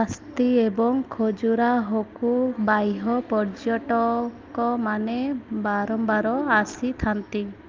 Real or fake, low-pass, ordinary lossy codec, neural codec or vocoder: real; 7.2 kHz; Opus, 32 kbps; none